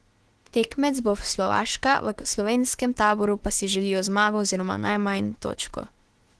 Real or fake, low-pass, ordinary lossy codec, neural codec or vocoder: fake; 10.8 kHz; Opus, 16 kbps; autoencoder, 48 kHz, 32 numbers a frame, DAC-VAE, trained on Japanese speech